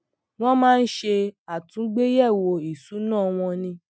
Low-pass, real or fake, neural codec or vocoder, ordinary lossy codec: none; real; none; none